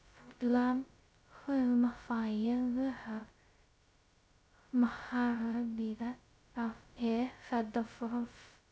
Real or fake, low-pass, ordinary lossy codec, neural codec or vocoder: fake; none; none; codec, 16 kHz, 0.2 kbps, FocalCodec